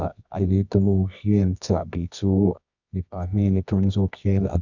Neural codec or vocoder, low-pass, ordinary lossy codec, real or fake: codec, 24 kHz, 0.9 kbps, WavTokenizer, medium music audio release; 7.2 kHz; none; fake